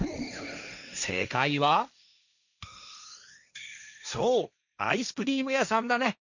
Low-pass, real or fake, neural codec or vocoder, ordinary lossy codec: 7.2 kHz; fake; codec, 16 kHz, 1.1 kbps, Voila-Tokenizer; none